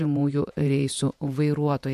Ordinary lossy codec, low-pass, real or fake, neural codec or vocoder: MP3, 64 kbps; 14.4 kHz; fake; vocoder, 48 kHz, 128 mel bands, Vocos